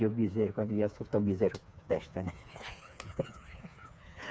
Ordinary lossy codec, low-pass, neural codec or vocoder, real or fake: none; none; codec, 16 kHz, 4 kbps, FreqCodec, smaller model; fake